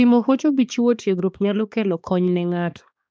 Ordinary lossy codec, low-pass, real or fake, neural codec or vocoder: none; none; fake; codec, 16 kHz, 2 kbps, X-Codec, HuBERT features, trained on balanced general audio